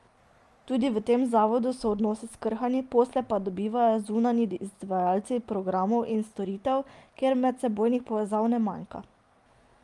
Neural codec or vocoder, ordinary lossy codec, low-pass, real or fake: none; Opus, 32 kbps; 10.8 kHz; real